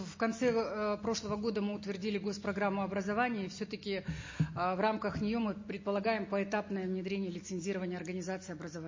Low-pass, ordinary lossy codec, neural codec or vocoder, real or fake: 7.2 kHz; MP3, 32 kbps; none; real